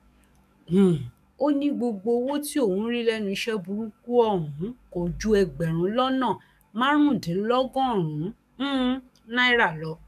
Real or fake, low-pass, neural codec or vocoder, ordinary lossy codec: fake; 14.4 kHz; codec, 44.1 kHz, 7.8 kbps, DAC; none